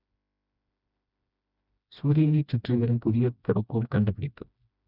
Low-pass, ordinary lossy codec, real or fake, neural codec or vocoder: 5.4 kHz; none; fake; codec, 16 kHz, 1 kbps, FreqCodec, smaller model